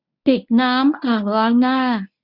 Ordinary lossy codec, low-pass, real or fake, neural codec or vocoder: none; 5.4 kHz; fake; codec, 24 kHz, 0.9 kbps, WavTokenizer, medium speech release version 1